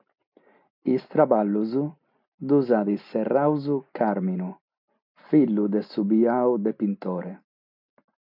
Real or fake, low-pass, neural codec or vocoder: real; 5.4 kHz; none